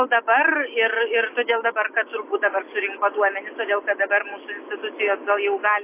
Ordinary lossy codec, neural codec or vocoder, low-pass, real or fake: AAC, 24 kbps; none; 3.6 kHz; real